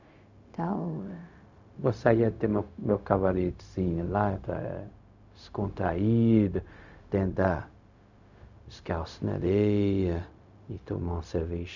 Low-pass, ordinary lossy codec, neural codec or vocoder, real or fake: 7.2 kHz; none; codec, 16 kHz, 0.4 kbps, LongCat-Audio-Codec; fake